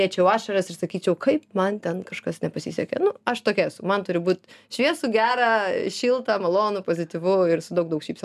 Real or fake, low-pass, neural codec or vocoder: real; 14.4 kHz; none